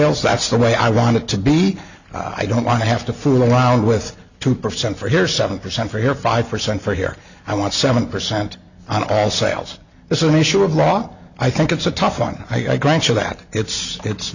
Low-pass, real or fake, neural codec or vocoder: 7.2 kHz; real; none